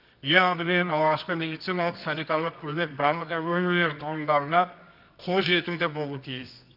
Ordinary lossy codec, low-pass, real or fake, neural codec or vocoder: none; 5.4 kHz; fake; codec, 24 kHz, 0.9 kbps, WavTokenizer, medium music audio release